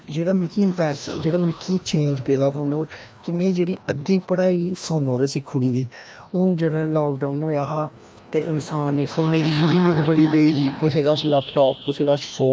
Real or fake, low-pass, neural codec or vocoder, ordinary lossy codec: fake; none; codec, 16 kHz, 1 kbps, FreqCodec, larger model; none